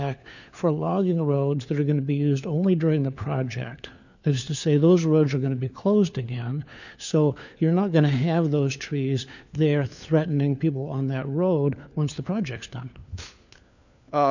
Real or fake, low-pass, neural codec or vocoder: fake; 7.2 kHz; codec, 16 kHz, 4 kbps, FunCodec, trained on LibriTTS, 50 frames a second